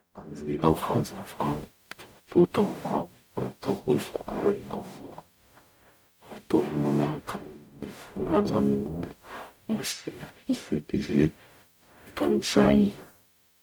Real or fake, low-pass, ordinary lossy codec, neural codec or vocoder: fake; none; none; codec, 44.1 kHz, 0.9 kbps, DAC